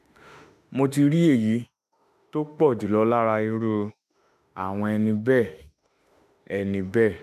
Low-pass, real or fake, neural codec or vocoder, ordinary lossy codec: 14.4 kHz; fake; autoencoder, 48 kHz, 32 numbers a frame, DAC-VAE, trained on Japanese speech; none